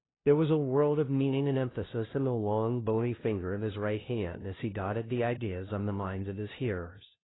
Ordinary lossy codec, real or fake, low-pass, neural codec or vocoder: AAC, 16 kbps; fake; 7.2 kHz; codec, 16 kHz, 0.5 kbps, FunCodec, trained on LibriTTS, 25 frames a second